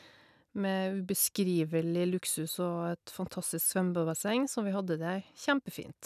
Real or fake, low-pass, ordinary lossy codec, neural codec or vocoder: real; 14.4 kHz; none; none